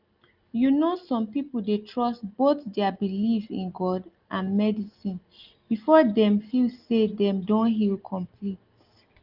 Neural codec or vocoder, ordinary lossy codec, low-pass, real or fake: none; Opus, 32 kbps; 5.4 kHz; real